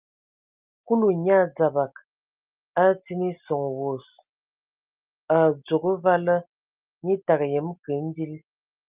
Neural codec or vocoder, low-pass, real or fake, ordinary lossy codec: none; 3.6 kHz; real; Opus, 24 kbps